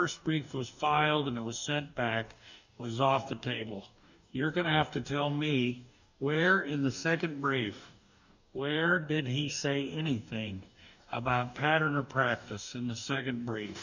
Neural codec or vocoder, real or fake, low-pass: codec, 44.1 kHz, 2.6 kbps, DAC; fake; 7.2 kHz